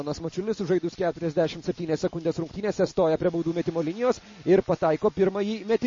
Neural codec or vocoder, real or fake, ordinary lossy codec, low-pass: none; real; MP3, 32 kbps; 7.2 kHz